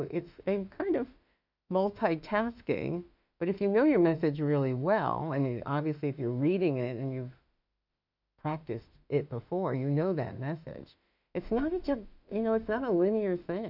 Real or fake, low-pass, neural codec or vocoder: fake; 5.4 kHz; autoencoder, 48 kHz, 32 numbers a frame, DAC-VAE, trained on Japanese speech